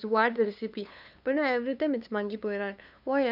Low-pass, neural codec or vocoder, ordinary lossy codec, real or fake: 5.4 kHz; codec, 16 kHz, 2 kbps, FunCodec, trained on LibriTTS, 25 frames a second; none; fake